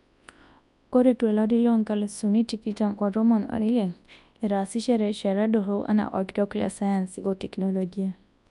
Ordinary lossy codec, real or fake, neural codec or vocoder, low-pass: none; fake; codec, 24 kHz, 0.9 kbps, WavTokenizer, large speech release; 10.8 kHz